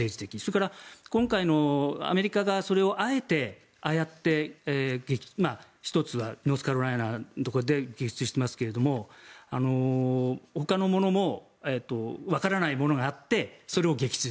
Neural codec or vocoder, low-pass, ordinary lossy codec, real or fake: none; none; none; real